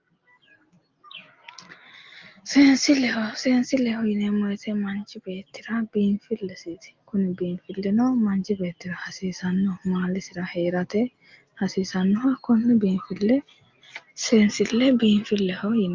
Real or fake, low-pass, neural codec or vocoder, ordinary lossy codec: real; 7.2 kHz; none; Opus, 24 kbps